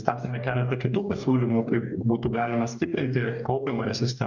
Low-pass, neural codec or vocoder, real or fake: 7.2 kHz; codec, 44.1 kHz, 2.6 kbps, DAC; fake